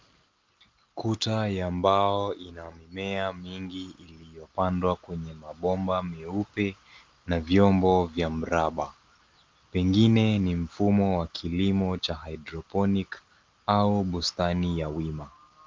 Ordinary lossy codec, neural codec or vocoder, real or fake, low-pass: Opus, 32 kbps; none; real; 7.2 kHz